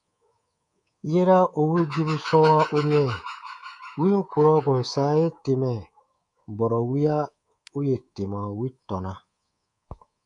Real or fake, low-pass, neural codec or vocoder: fake; 10.8 kHz; codec, 24 kHz, 3.1 kbps, DualCodec